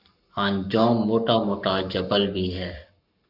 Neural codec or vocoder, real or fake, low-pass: codec, 44.1 kHz, 7.8 kbps, Pupu-Codec; fake; 5.4 kHz